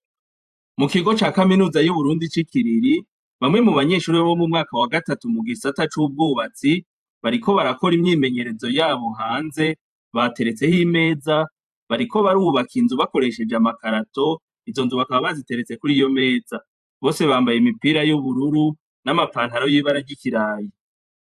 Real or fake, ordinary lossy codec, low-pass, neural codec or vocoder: fake; MP3, 96 kbps; 14.4 kHz; vocoder, 44.1 kHz, 128 mel bands every 512 samples, BigVGAN v2